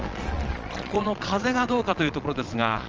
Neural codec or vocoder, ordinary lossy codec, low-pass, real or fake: vocoder, 22.05 kHz, 80 mel bands, Vocos; Opus, 24 kbps; 7.2 kHz; fake